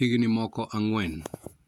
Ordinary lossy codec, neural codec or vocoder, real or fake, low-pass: MP3, 96 kbps; vocoder, 48 kHz, 128 mel bands, Vocos; fake; 19.8 kHz